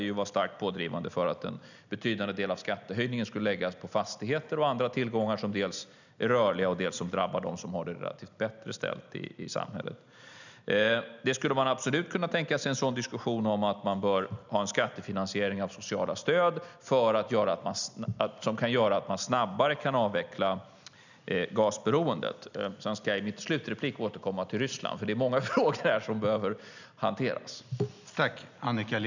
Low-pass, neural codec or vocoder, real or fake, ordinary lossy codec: 7.2 kHz; none; real; none